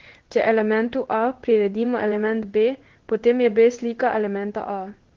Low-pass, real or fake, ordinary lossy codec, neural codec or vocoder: 7.2 kHz; fake; Opus, 16 kbps; codec, 16 kHz in and 24 kHz out, 1 kbps, XY-Tokenizer